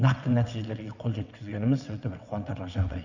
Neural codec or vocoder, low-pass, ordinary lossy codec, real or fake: vocoder, 22.05 kHz, 80 mel bands, WaveNeXt; 7.2 kHz; none; fake